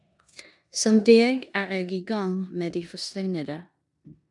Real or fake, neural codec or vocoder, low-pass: fake; codec, 16 kHz in and 24 kHz out, 0.9 kbps, LongCat-Audio-Codec, four codebook decoder; 10.8 kHz